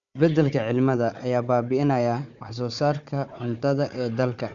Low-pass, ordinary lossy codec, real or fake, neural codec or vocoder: 7.2 kHz; none; fake; codec, 16 kHz, 4 kbps, FunCodec, trained on Chinese and English, 50 frames a second